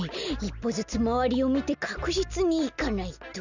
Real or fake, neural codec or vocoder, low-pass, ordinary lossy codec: real; none; 7.2 kHz; none